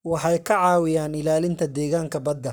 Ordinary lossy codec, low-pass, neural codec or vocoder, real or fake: none; none; codec, 44.1 kHz, 7.8 kbps, Pupu-Codec; fake